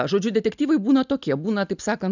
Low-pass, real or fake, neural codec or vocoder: 7.2 kHz; real; none